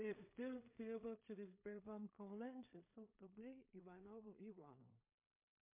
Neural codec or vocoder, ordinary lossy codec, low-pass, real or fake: codec, 16 kHz in and 24 kHz out, 0.4 kbps, LongCat-Audio-Codec, two codebook decoder; MP3, 24 kbps; 3.6 kHz; fake